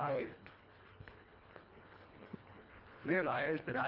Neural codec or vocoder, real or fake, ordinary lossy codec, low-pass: codec, 24 kHz, 1.5 kbps, HILCodec; fake; Opus, 32 kbps; 5.4 kHz